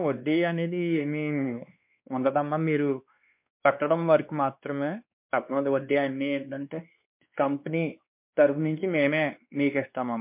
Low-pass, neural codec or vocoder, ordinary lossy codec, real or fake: 3.6 kHz; codec, 16 kHz, 2 kbps, X-Codec, WavLM features, trained on Multilingual LibriSpeech; MP3, 32 kbps; fake